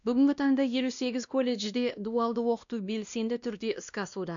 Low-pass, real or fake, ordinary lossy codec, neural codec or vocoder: 7.2 kHz; fake; none; codec, 16 kHz, 1 kbps, X-Codec, WavLM features, trained on Multilingual LibriSpeech